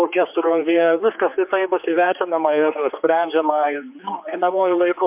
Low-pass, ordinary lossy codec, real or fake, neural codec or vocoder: 3.6 kHz; MP3, 32 kbps; fake; codec, 16 kHz, 4 kbps, X-Codec, HuBERT features, trained on general audio